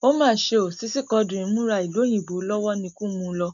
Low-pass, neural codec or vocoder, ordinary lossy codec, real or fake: 7.2 kHz; none; none; real